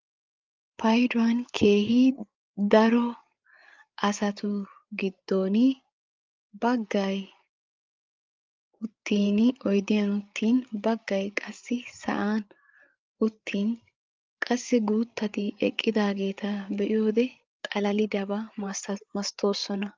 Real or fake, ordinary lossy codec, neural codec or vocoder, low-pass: fake; Opus, 24 kbps; codec, 16 kHz, 8 kbps, FreqCodec, larger model; 7.2 kHz